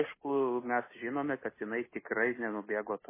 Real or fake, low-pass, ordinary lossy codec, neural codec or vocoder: real; 3.6 kHz; MP3, 16 kbps; none